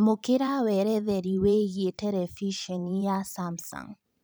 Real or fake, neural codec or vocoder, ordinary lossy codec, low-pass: fake; vocoder, 44.1 kHz, 128 mel bands every 512 samples, BigVGAN v2; none; none